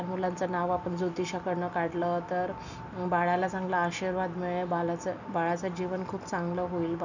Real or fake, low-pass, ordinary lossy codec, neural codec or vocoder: real; 7.2 kHz; none; none